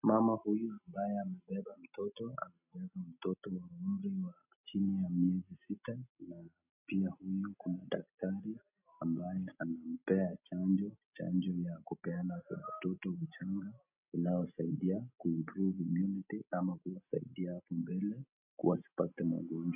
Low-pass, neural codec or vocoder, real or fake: 3.6 kHz; none; real